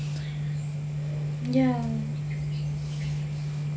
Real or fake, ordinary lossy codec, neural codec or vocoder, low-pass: real; none; none; none